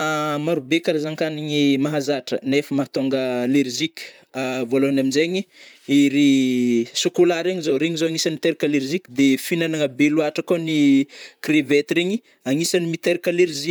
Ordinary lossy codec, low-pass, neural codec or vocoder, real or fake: none; none; vocoder, 44.1 kHz, 128 mel bands, Pupu-Vocoder; fake